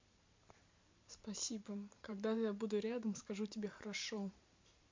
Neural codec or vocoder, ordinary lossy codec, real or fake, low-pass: vocoder, 44.1 kHz, 128 mel bands every 512 samples, BigVGAN v2; MP3, 48 kbps; fake; 7.2 kHz